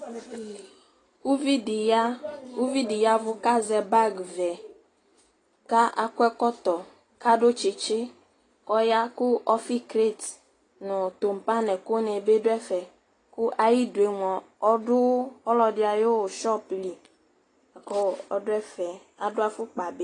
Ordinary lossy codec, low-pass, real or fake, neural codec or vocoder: AAC, 32 kbps; 10.8 kHz; real; none